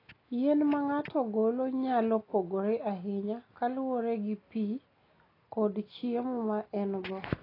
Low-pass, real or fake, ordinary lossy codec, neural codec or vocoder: 5.4 kHz; real; AAC, 24 kbps; none